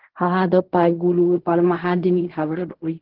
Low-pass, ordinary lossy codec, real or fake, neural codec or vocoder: 5.4 kHz; Opus, 16 kbps; fake; codec, 16 kHz in and 24 kHz out, 0.4 kbps, LongCat-Audio-Codec, fine tuned four codebook decoder